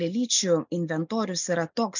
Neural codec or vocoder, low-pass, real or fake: none; 7.2 kHz; real